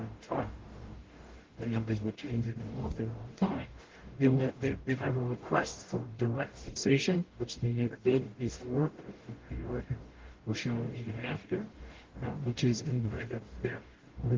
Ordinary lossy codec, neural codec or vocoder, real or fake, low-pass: Opus, 16 kbps; codec, 44.1 kHz, 0.9 kbps, DAC; fake; 7.2 kHz